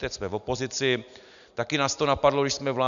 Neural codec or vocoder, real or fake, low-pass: none; real; 7.2 kHz